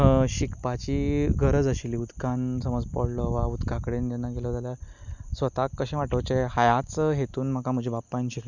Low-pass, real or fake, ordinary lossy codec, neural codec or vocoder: 7.2 kHz; real; none; none